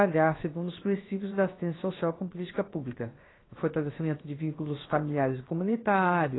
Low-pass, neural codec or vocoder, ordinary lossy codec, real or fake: 7.2 kHz; codec, 16 kHz, 0.7 kbps, FocalCodec; AAC, 16 kbps; fake